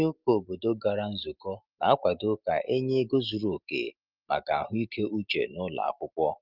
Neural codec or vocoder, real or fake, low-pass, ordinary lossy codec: none; real; 5.4 kHz; Opus, 32 kbps